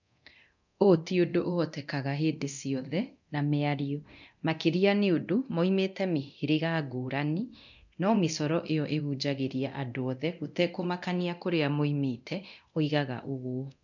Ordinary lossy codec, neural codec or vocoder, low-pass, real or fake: none; codec, 24 kHz, 0.9 kbps, DualCodec; 7.2 kHz; fake